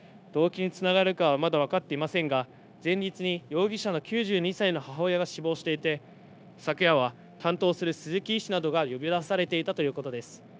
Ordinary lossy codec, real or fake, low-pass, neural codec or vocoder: none; fake; none; codec, 16 kHz, 0.9 kbps, LongCat-Audio-Codec